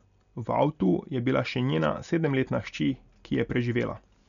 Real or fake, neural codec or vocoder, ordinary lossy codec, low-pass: real; none; none; 7.2 kHz